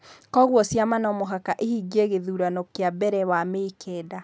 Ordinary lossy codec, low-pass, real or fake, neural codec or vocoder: none; none; real; none